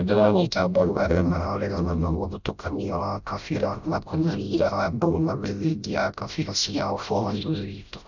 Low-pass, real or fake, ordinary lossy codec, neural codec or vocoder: 7.2 kHz; fake; none; codec, 16 kHz, 0.5 kbps, FreqCodec, smaller model